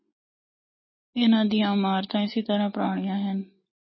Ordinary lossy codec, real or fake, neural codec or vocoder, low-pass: MP3, 24 kbps; real; none; 7.2 kHz